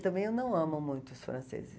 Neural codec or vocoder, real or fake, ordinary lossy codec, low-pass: none; real; none; none